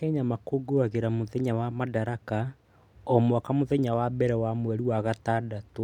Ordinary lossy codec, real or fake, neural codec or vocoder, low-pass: none; real; none; 19.8 kHz